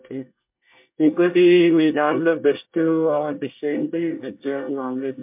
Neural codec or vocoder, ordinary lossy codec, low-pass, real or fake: codec, 24 kHz, 1 kbps, SNAC; MP3, 32 kbps; 3.6 kHz; fake